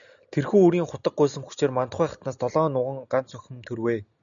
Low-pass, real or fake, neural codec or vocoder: 7.2 kHz; real; none